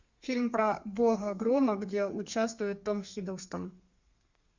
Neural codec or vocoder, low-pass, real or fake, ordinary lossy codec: codec, 32 kHz, 1.9 kbps, SNAC; 7.2 kHz; fake; Opus, 64 kbps